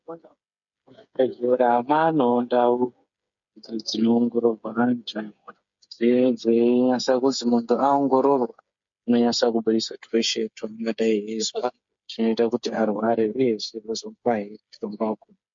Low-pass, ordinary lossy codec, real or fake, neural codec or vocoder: 7.2 kHz; MP3, 48 kbps; fake; codec, 16 kHz, 8 kbps, FreqCodec, smaller model